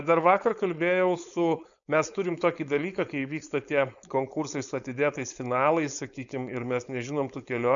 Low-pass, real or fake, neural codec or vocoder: 7.2 kHz; fake; codec, 16 kHz, 4.8 kbps, FACodec